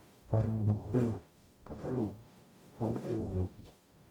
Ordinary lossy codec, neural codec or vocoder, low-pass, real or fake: MP3, 96 kbps; codec, 44.1 kHz, 0.9 kbps, DAC; 19.8 kHz; fake